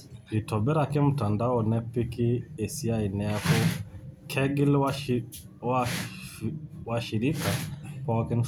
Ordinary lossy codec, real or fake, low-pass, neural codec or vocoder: none; real; none; none